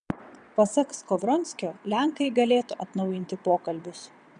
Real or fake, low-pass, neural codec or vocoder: fake; 9.9 kHz; vocoder, 22.05 kHz, 80 mel bands, WaveNeXt